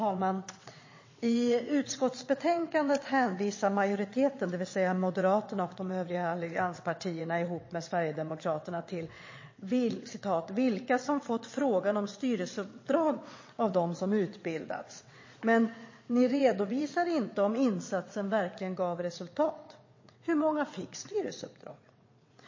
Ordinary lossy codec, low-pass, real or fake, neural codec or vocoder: MP3, 32 kbps; 7.2 kHz; fake; vocoder, 22.05 kHz, 80 mel bands, WaveNeXt